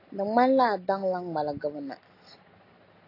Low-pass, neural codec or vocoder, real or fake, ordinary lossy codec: 5.4 kHz; none; real; AAC, 32 kbps